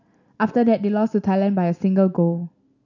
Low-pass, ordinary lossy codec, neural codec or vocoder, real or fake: 7.2 kHz; none; none; real